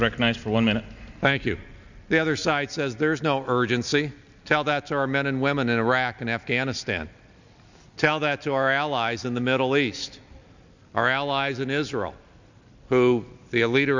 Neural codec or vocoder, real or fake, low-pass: none; real; 7.2 kHz